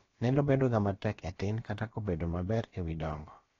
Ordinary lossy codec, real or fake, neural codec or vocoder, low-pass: AAC, 32 kbps; fake; codec, 16 kHz, about 1 kbps, DyCAST, with the encoder's durations; 7.2 kHz